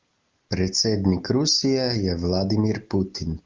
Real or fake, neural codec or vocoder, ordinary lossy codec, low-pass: real; none; Opus, 24 kbps; 7.2 kHz